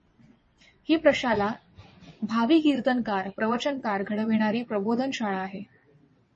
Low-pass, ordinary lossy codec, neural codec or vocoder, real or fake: 9.9 kHz; MP3, 32 kbps; vocoder, 22.05 kHz, 80 mel bands, WaveNeXt; fake